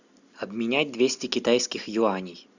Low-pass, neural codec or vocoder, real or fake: 7.2 kHz; none; real